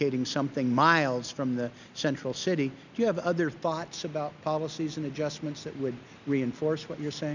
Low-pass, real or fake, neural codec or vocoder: 7.2 kHz; real; none